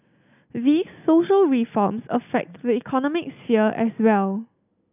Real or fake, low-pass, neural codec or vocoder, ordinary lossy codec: real; 3.6 kHz; none; none